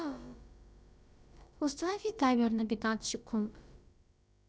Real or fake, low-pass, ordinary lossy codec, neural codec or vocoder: fake; none; none; codec, 16 kHz, about 1 kbps, DyCAST, with the encoder's durations